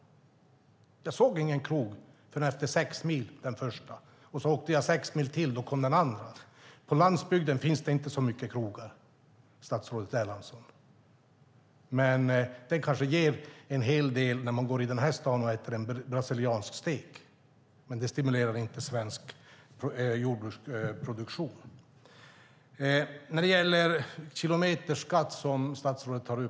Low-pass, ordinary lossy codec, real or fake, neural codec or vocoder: none; none; real; none